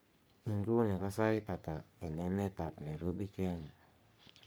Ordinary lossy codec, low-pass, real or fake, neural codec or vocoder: none; none; fake; codec, 44.1 kHz, 3.4 kbps, Pupu-Codec